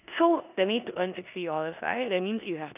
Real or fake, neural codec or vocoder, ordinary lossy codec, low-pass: fake; codec, 16 kHz in and 24 kHz out, 0.9 kbps, LongCat-Audio-Codec, four codebook decoder; none; 3.6 kHz